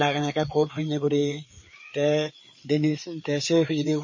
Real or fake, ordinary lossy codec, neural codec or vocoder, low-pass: fake; MP3, 32 kbps; codec, 16 kHz, 4 kbps, FreqCodec, larger model; 7.2 kHz